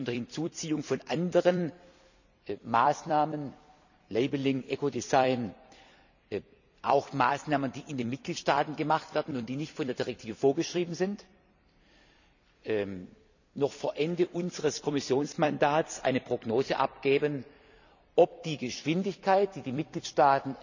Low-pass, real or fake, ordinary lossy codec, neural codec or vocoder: 7.2 kHz; fake; none; vocoder, 44.1 kHz, 128 mel bands every 256 samples, BigVGAN v2